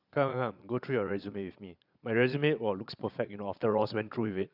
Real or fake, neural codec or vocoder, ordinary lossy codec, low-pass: fake; vocoder, 22.05 kHz, 80 mel bands, Vocos; none; 5.4 kHz